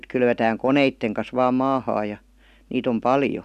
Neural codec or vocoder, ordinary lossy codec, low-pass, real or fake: none; none; 14.4 kHz; real